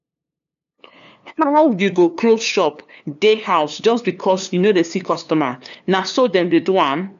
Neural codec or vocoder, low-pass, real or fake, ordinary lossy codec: codec, 16 kHz, 2 kbps, FunCodec, trained on LibriTTS, 25 frames a second; 7.2 kHz; fake; none